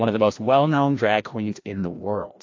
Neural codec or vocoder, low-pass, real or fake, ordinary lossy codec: codec, 16 kHz, 1 kbps, FreqCodec, larger model; 7.2 kHz; fake; AAC, 48 kbps